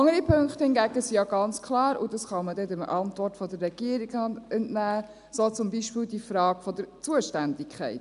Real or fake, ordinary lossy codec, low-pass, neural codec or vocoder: real; none; 10.8 kHz; none